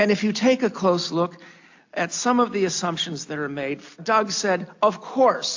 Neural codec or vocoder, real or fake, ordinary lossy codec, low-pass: none; real; AAC, 48 kbps; 7.2 kHz